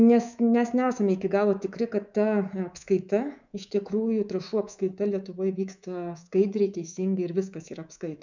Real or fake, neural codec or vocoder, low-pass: fake; codec, 24 kHz, 3.1 kbps, DualCodec; 7.2 kHz